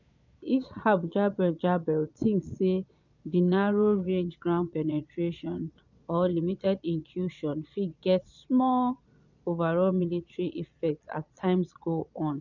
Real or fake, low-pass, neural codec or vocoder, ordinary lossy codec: fake; 7.2 kHz; vocoder, 22.05 kHz, 80 mel bands, Vocos; none